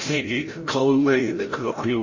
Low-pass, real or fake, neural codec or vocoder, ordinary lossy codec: 7.2 kHz; fake; codec, 16 kHz, 0.5 kbps, FreqCodec, larger model; MP3, 32 kbps